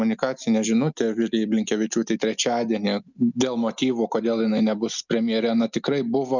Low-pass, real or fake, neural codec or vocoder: 7.2 kHz; real; none